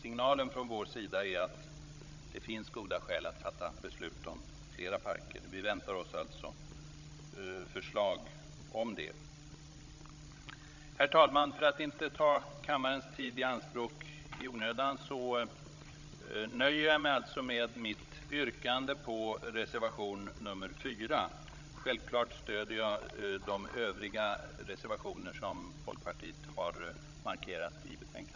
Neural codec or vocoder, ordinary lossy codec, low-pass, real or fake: codec, 16 kHz, 16 kbps, FreqCodec, larger model; none; 7.2 kHz; fake